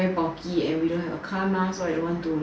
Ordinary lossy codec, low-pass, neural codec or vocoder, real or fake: none; none; none; real